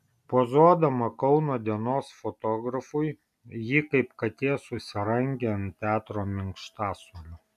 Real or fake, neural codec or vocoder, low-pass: real; none; 14.4 kHz